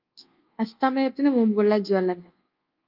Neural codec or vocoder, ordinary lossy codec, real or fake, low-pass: codec, 24 kHz, 1.2 kbps, DualCodec; Opus, 24 kbps; fake; 5.4 kHz